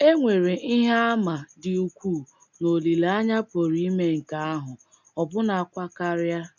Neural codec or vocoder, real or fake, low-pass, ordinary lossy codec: none; real; 7.2 kHz; none